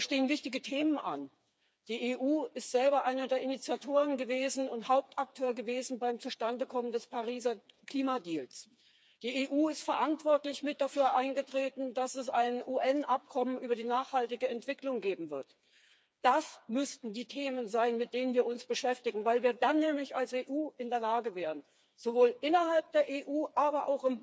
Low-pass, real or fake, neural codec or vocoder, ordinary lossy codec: none; fake; codec, 16 kHz, 4 kbps, FreqCodec, smaller model; none